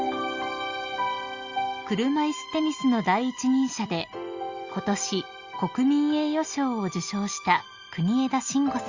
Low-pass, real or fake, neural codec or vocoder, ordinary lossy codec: 7.2 kHz; real; none; Opus, 64 kbps